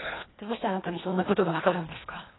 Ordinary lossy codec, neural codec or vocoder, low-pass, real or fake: AAC, 16 kbps; codec, 24 kHz, 1.5 kbps, HILCodec; 7.2 kHz; fake